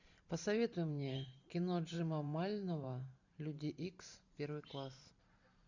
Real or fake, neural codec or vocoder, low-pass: fake; vocoder, 44.1 kHz, 128 mel bands every 512 samples, BigVGAN v2; 7.2 kHz